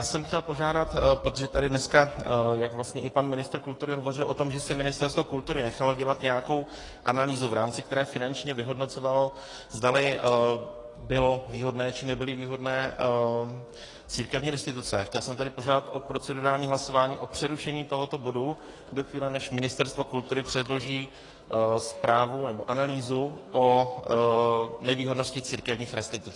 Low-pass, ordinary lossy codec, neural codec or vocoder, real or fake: 10.8 kHz; AAC, 32 kbps; codec, 44.1 kHz, 2.6 kbps, SNAC; fake